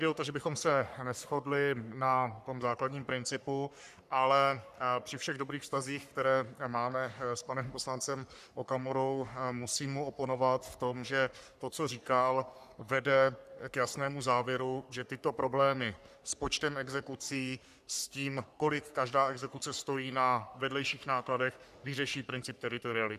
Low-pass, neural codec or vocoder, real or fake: 14.4 kHz; codec, 44.1 kHz, 3.4 kbps, Pupu-Codec; fake